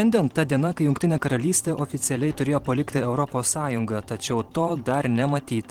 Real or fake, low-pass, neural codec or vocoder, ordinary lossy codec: fake; 19.8 kHz; vocoder, 44.1 kHz, 128 mel bands every 512 samples, BigVGAN v2; Opus, 16 kbps